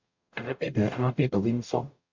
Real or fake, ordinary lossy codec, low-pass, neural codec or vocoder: fake; MP3, 48 kbps; 7.2 kHz; codec, 44.1 kHz, 0.9 kbps, DAC